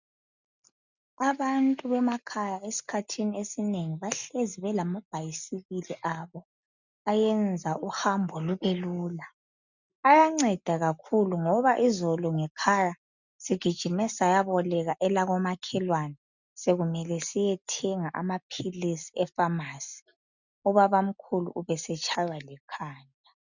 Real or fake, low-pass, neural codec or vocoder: real; 7.2 kHz; none